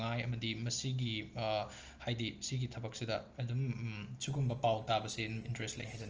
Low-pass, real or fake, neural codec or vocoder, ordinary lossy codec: 7.2 kHz; real; none; Opus, 24 kbps